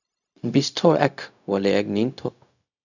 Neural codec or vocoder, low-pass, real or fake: codec, 16 kHz, 0.4 kbps, LongCat-Audio-Codec; 7.2 kHz; fake